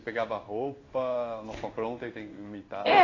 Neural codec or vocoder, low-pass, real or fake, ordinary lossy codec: codec, 16 kHz in and 24 kHz out, 1 kbps, XY-Tokenizer; 7.2 kHz; fake; AAC, 32 kbps